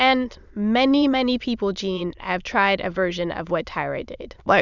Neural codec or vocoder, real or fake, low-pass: autoencoder, 22.05 kHz, a latent of 192 numbers a frame, VITS, trained on many speakers; fake; 7.2 kHz